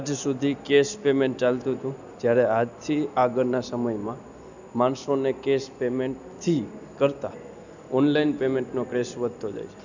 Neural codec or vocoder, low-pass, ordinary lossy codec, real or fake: none; 7.2 kHz; none; real